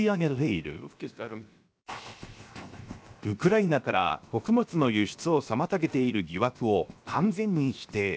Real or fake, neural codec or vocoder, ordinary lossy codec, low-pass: fake; codec, 16 kHz, 0.7 kbps, FocalCodec; none; none